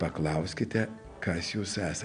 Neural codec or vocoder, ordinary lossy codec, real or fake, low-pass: none; MP3, 96 kbps; real; 9.9 kHz